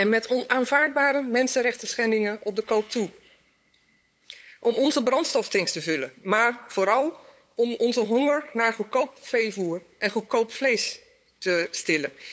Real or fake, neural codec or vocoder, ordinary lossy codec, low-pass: fake; codec, 16 kHz, 8 kbps, FunCodec, trained on LibriTTS, 25 frames a second; none; none